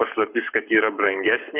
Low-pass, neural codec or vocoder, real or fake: 3.6 kHz; codec, 44.1 kHz, 7.8 kbps, Pupu-Codec; fake